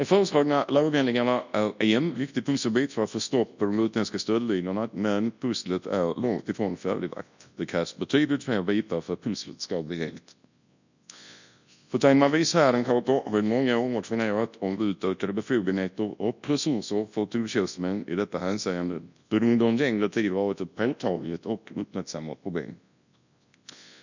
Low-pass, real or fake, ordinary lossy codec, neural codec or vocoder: 7.2 kHz; fake; none; codec, 24 kHz, 0.9 kbps, WavTokenizer, large speech release